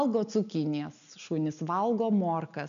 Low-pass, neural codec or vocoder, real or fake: 7.2 kHz; none; real